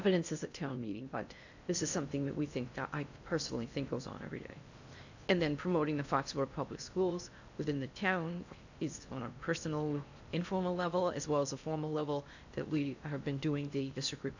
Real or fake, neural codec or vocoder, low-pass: fake; codec, 16 kHz in and 24 kHz out, 0.6 kbps, FocalCodec, streaming, 4096 codes; 7.2 kHz